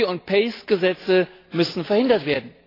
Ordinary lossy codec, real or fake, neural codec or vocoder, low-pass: AAC, 24 kbps; real; none; 5.4 kHz